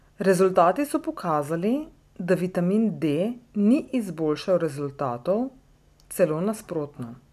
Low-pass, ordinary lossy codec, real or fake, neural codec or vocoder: 14.4 kHz; none; real; none